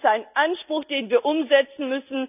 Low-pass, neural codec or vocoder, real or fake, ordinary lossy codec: 3.6 kHz; none; real; none